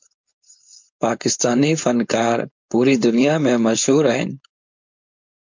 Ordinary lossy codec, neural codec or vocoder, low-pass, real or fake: MP3, 64 kbps; codec, 16 kHz, 4.8 kbps, FACodec; 7.2 kHz; fake